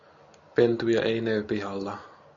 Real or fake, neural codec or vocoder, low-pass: real; none; 7.2 kHz